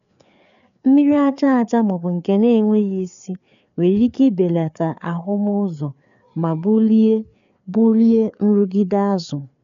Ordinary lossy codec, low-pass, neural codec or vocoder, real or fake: none; 7.2 kHz; codec, 16 kHz, 4 kbps, FreqCodec, larger model; fake